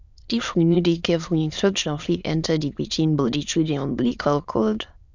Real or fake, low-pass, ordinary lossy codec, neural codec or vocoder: fake; 7.2 kHz; none; autoencoder, 22.05 kHz, a latent of 192 numbers a frame, VITS, trained on many speakers